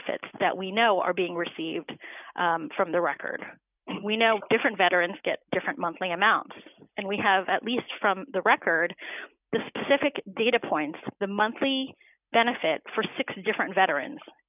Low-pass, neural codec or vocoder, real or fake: 3.6 kHz; none; real